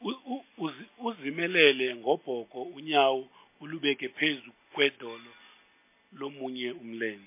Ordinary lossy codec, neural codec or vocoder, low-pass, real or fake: MP3, 24 kbps; none; 3.6 kHz; real